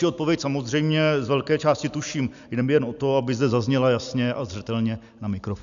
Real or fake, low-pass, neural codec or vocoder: real; 7.2 kHz; none